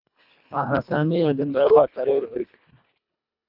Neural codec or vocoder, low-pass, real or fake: codec, 24 kHz, 1.5 kbps, HILCodec; 5.4 kHz; fake